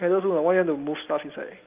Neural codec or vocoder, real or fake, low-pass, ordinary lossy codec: none; real; 3.6 kHz; Opus, 24 kbps